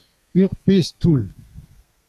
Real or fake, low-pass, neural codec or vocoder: fake; 14.4 kHz; codec, 32 kHz, 1.9 kbps, SNAC